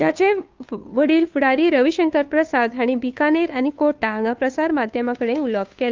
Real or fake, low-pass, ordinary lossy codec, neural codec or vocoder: fake; 7.2 kHz; Opus, 24 kbps; codec, 16 kHz, 2 kbps, FunCodec, trained on Chinese and English, 25 frames a second